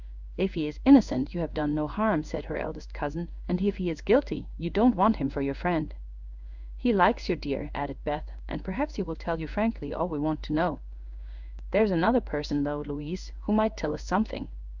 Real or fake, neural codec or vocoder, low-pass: fake; codec, 16 kHz in and 24 kHz out, 1 kbps, XY-Tokenizer; 7.2 kHz